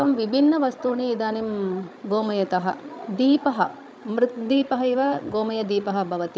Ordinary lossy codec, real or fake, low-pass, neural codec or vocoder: none; fake; none; codec, 16 kHz, 16 kbps, FreqCodec, larger model